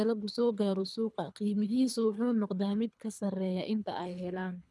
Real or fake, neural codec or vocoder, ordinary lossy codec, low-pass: fake; codec, 24 kHz, 3 kbps, HILCodec; none; none